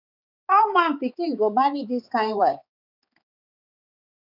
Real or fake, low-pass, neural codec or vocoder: fake; 5.4 kHz; codec, 16 kHz, 4 kbps, X-Codec, HuBERT features, trained on balanced general audio